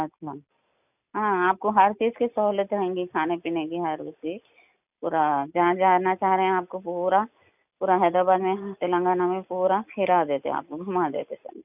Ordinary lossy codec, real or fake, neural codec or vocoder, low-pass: none; real; none; 3.6 kHz